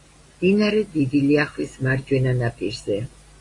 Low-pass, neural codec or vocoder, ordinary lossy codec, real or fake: 10.8 kHz; none; AAC, 32 kbps; real